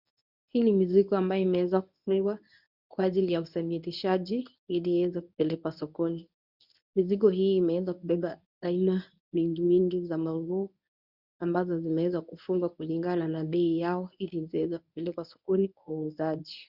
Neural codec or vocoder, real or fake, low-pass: codec, 24 kHz, 0.9 kbps, WavTokenizer, medium speech release version 1; fake; 5.4 kHz